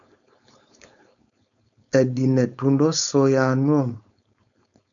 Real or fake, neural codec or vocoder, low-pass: fake; codec, 16 kHz, 4.8 kbps, FACodec; 7.2 kHz